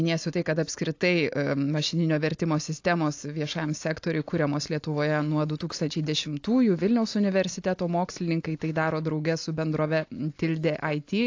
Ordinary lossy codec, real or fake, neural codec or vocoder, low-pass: AAC, 48 kbps; real; none; 7.2 kHz